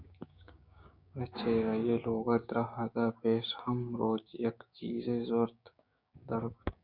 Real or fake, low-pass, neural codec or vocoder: fake; 5.4 kHz; codec, 16 kHz, 6 kbps, DAC